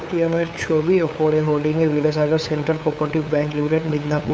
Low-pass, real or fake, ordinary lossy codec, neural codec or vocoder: none; fake; none; codec, 16 kHz, 8 kbps, FunCodec, trained on LibriTTS, 25 frames a second